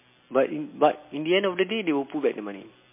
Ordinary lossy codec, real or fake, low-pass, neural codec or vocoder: MP3, 24 kbps; real; 3.6 kHz; none